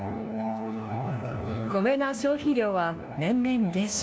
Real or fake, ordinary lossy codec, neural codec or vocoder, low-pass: fake; none; codec, 16 kHz, 1 kbps, FunCodec, trained on LibriTTS, 50 frames a second; none